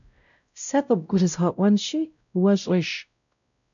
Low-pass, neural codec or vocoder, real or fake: 7.2 kHz; codec, 16 kHz, 0.5 kbps, X-Codec, WavLM features, trained on Multilingual LibriSpeech; fake